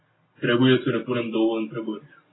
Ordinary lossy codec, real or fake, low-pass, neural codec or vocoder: AAC, 16 kbps; real; 7.2 kHz; none